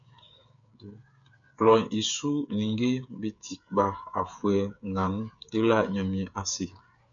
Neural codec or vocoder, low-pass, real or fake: codec, 16 kHz, 8 kbps, FreqCodec, smaller model; 7.2 kHz; fake